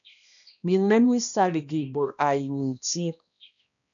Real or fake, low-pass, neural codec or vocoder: fake; 7.2 kHz; codec, 16 kHz, 1 kbps, X-Codec, HuBERT features, trained on balanced general audio